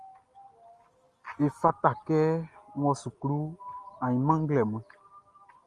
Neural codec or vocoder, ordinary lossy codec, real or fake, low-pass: none; Opus, 32 kbps; real; 10.8 kHz